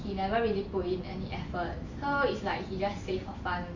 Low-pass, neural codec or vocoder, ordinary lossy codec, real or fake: 7.2 kHz; none; MP3, 48 kbps; real